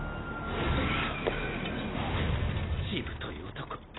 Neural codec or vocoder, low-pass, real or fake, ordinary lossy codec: none; 7.2 kHz; real; AAC, 16 kbps